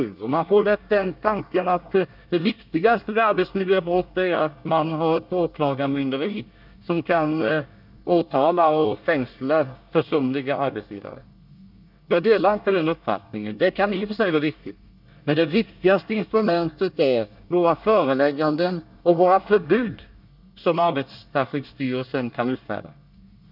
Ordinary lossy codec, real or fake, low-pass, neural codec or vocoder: none; fake; 5.4 kHz; codec, 24 kHz, 1 kbps, SNAC